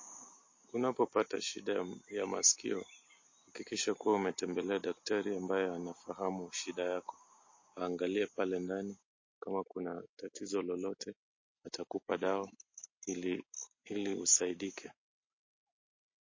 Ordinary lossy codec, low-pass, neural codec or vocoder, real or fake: MP3, 32 kbps; 7.2 kHz; none; real